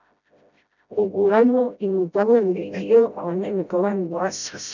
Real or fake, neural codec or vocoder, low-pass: fake; codec, 16 kHz, 0.5 kbps, FreqCodec, smaller model; 7.2 kHz